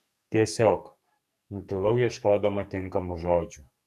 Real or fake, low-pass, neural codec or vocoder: fake; 14.4 kHz; codec, 44.1 kHz, 2.6 kbps, SNAC